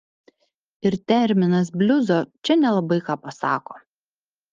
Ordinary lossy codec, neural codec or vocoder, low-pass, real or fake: Opus, 24 kbps; none; 7.2 kHz; real